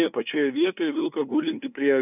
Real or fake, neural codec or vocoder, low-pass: fake; codec, 16 kHz, 2 kbps, FunCodec, trained on LibriTTS, 25 frames a second; 3.6 kHz